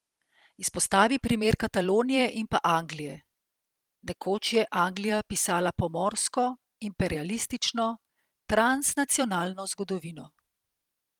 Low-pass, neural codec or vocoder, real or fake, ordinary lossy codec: 19.8 kHz; vocoder, 44.1 kHz, 128 mel bands every 512 samples, BigVGAN v2; fake; Opus, 24 kbps